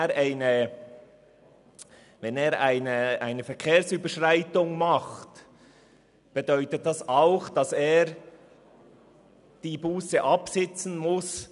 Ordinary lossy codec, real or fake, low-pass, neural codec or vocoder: none; real; 10.8 kHz; none